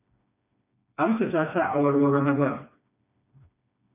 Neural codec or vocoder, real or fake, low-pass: codec, 16 kHz, 2 kbps, FreqCodec, smaller model; fake; 3.6 kHz